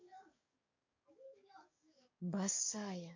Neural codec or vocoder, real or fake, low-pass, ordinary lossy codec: codec, 44.1 kHz, 7.8 kbps, DAC; fake; 7.2 kHz; MP3, 48 kbps